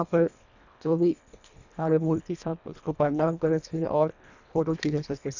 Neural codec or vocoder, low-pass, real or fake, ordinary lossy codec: codec, 24 kHz, 1.5 kbps, HILCodec; 7.2 kHz; fake; none